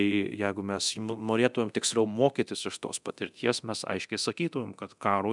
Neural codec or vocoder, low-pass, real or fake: codec, 24 kHz, 0.9 kbps, DualCodec; 10.8 kHz; fake